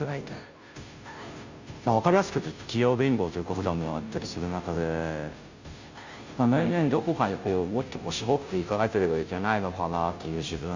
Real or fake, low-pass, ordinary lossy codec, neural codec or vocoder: fake; 7.2 kHz; Opus, 64 kbps; codec, 16 kHz, 0.5 kbps, FunCodec, trained on Chinese and English, 25 frames a second